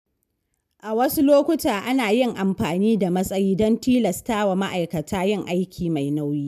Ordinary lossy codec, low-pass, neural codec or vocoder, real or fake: none; 14.4 kHz; none; real